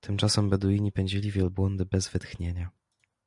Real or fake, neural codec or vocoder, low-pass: real; none; 10.8 kHz